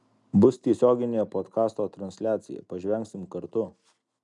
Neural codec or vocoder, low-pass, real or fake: none; 10.8 kHz; real